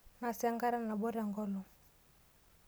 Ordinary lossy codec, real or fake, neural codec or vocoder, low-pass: none; real; none; none